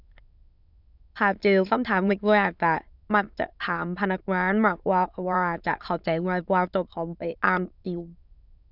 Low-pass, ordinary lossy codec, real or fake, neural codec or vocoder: 5.4 kHz; none; fake; autoencoder, 22.05 kHz, a latent of 192 numbers a frame, VITS, trained on many speakers